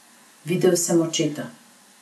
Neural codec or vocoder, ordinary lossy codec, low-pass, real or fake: none; none; none; real